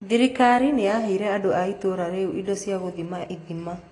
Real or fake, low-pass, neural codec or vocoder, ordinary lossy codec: real; 10.8 kHz; none; AAC, 32 kbps